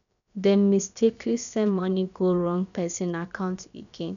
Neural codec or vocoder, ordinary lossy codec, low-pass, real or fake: codec, 16 kHz, about 1 kbps, DyCAST, with the encoder's durations; none; 7.2 kHz; fake